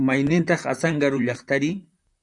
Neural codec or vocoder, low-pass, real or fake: vocoder, 44.1 kHz, 128 mel bands, Pupu-Vocoder; 10.8 kHz; fake